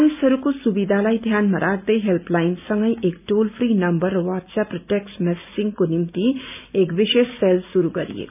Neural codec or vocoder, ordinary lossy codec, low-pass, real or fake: none; none; 3.6 kHz; real